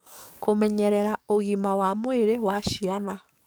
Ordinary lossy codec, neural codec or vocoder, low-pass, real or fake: none; codec, 44.1 kHz, 7.8 kbps, DAC; none; fake